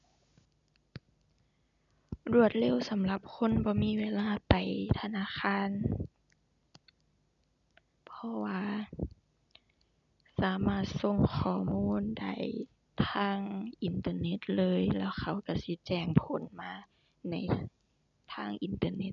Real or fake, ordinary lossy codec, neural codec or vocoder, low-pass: real; none; none; 7.2 kHz